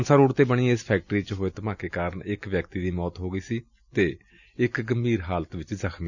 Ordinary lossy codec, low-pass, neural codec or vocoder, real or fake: none; 7.2 kHz; none; real